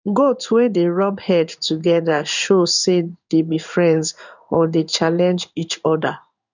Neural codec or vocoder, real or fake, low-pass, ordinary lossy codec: codec, 16 kHz in and 24 kHz out, 1 kbps, XY-Tokenizer; fake; 7.2 kHz; none